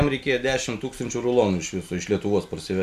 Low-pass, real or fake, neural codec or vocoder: 14.4 kHz; real; none